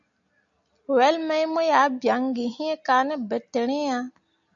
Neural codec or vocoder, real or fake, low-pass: none; real; 7.2 kHz